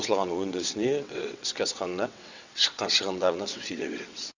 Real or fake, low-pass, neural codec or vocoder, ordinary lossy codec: fake; 7.2 kHz; vocoder, 22.05 kHz, 80 mel bands, WaveNeXt; Opus, 64 kbps